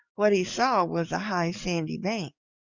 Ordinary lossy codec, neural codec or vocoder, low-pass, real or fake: Opus, 64 kbps; codec, 44.1 kHz, 7.8 kbps, DAC; 7.2 kHz; fake